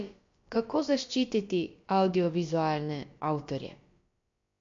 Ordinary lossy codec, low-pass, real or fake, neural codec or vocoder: MP3, 48 kbps; 7.2 kHz; fake; codec, 16 kHz, about 1 kbps, DyCAST, with the encoder's durations